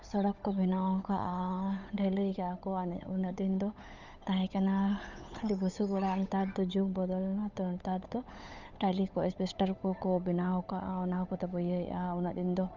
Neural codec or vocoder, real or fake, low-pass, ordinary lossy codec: codec, 16 kHz, 8 kbps, FunCodec, trained on Chinese and English, 25 frames a second; fake; 7.2 kHz; none